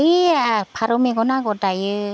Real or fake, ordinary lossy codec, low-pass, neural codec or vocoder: real; none; none; none